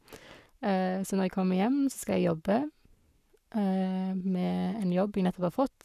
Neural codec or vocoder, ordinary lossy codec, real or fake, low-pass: codec, 44.1 kHz, 7.8 kbps, Pupu-Codec; none; fake; 14.4 kHz